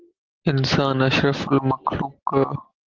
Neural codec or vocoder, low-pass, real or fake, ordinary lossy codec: none; 7.2 kHz; real; Opus, 16 kbps